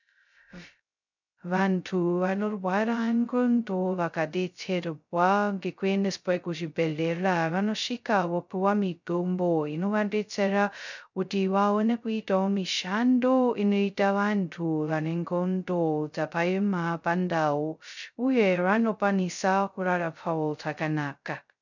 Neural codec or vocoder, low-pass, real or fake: codec, 16 kHz, 0.2 kbps, FocalCodec; 7.2 kHz; fake